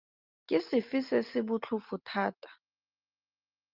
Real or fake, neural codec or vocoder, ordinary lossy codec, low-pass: real; none; Opus, 24 kbps; 5.4 kHz